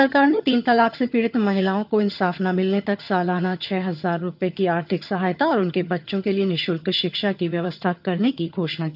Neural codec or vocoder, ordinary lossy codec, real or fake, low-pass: vocoder, 22.05 kHz, 80 mel bands, HiFi-GAN; none; fake; 5.4 kHz